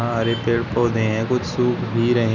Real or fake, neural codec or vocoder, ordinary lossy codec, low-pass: real; none; none; 7.2 kHz